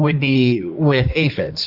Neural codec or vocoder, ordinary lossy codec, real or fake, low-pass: codec, 16 kHz in and 24 kHz out, 1.1 kbps, FireRedTTS-2 codec; Opus, 64 kbps; fake; 5.4 kHz